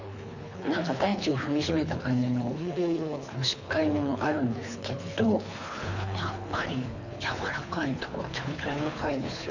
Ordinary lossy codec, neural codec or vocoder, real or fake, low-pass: none; codec, 24 kHz, 3 kbps, HILCodec; fake; 7.2 kHz